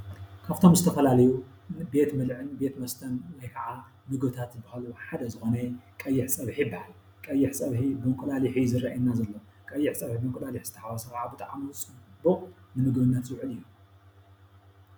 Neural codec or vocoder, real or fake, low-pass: none; real; 19.8 kHz